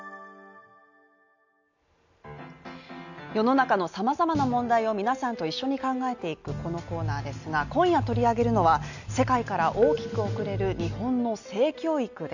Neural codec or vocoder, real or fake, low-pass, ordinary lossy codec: none; real; 7.2 kHz; none